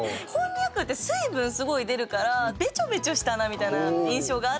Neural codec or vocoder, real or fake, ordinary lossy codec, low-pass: none; real; none; none